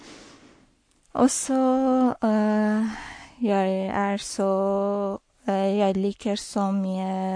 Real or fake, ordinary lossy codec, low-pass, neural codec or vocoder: fake; MP3, 48 kbps; 9.9 kHz; codec, 44.1 kHz, 7.8 kbps, Pupu-Codec